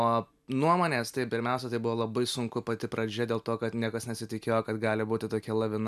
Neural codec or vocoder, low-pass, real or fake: none; 14.4 kHz; real